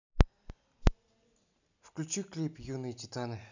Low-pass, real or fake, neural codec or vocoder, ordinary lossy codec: 7.2 kHz; real; none; none